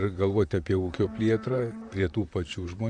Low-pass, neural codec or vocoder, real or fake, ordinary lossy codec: 9.9 kHz; vocoder, 44.1 kHz, 128 mel bands, Pupu-Vocoder; fake; Opus, 64 kbps